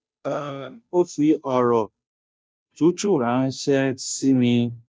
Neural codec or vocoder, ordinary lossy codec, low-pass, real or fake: codec, 16 kHz, 0.5 kbps, FunCodec, trained on Chinese and English, 25 frames a second; none; none; fake